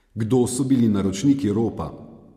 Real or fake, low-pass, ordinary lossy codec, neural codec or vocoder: real; 14.4 kHz; MP3, 64 kbps; none